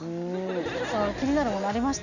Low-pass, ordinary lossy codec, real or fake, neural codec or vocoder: 7.2 kHz; none; real; none